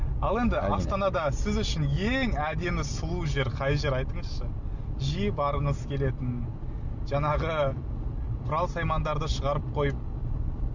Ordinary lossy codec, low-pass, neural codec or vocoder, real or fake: none; 7.2 kHz; none; real